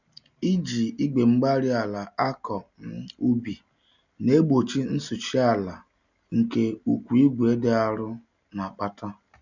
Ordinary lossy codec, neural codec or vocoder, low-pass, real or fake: none; none; 7.2 kHz; real